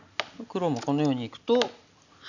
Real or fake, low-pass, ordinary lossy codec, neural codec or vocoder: real; 7.2 kHz; none; none